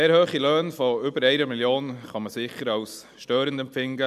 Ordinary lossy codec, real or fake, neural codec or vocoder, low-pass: MP3, 96 kbps; real; none; 14.4 kHz